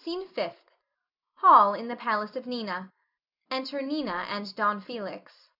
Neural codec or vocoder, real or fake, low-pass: none; real; 5.4 kHz